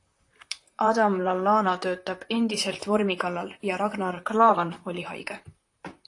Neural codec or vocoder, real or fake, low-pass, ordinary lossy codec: vocoder, 44.1 kHz, 128 mel bands, Pupu-Vocoder; fake; 10.8 kHz; AAC, 48 kbps